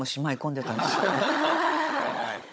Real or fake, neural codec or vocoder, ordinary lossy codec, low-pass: fake; codec, 16 kHz, 16 kbps, FunCodec, trained on Chinese and English, 50 frames a second; none; none